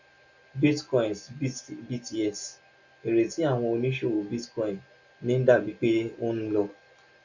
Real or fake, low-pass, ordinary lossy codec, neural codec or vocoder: real; 7.2 kHz; none; none